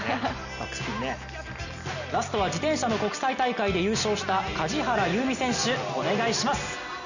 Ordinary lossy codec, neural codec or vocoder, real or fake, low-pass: none; none; real; 7.2 kHz